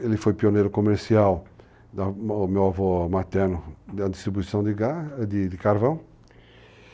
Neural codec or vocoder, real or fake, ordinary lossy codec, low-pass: none; real; none; none